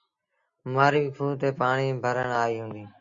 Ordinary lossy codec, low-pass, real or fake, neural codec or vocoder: Opus, 64 kbps; 7.2 kHz; real; none